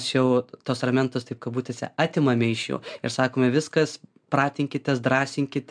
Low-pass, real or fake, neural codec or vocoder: 9.9 kHz; real; none